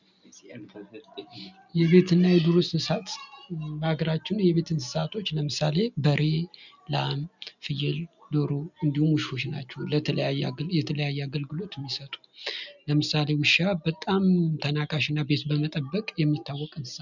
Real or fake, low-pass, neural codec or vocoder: real; 7.2 kHz; none